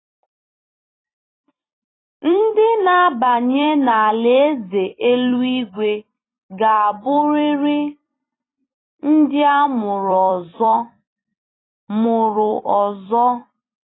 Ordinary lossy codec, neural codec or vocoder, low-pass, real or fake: AAC, 16 kbps; vocoder, 44.1 kHz, 128 mel bands every 256 samples, BigVGAN v2; 7.2 kHz; fake